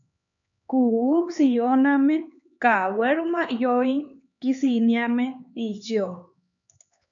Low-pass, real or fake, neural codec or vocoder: 7.2 kHz; fake; codec, 16 kHz, 4 kbps, X-Codec, HuBERT features, trained on LibriSpeech